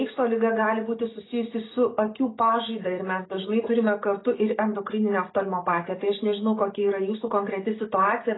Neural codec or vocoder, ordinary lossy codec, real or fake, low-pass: none; AAC, 16 kbps; real; 7.2 kHz